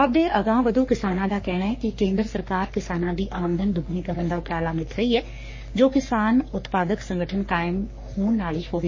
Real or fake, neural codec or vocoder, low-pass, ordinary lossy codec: fake; codec, 44.1 kHz, 3.4 kbps, Pupu-Codec; 7.2 kHz; MP3, 32 kbps